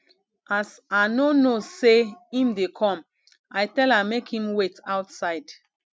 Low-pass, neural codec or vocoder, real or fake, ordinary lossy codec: none; none; real; none